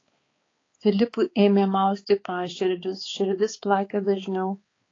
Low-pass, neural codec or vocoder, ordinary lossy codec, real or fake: 7.2 kHz; codec, 16 kHz, 4 kbps, X-Codec, HuBERT features, trained on balanced general audio; AAC, 32 kbps; fake